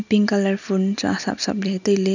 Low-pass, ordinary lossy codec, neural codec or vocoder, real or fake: 7.2 kHz; none; none; real